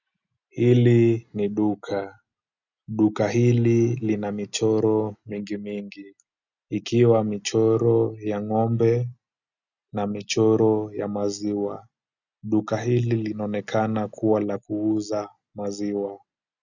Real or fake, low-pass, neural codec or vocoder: real; 7.2 kHz; none